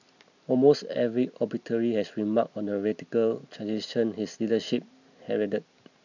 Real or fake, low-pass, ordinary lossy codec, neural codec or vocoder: real; 7.2 kHz; none; none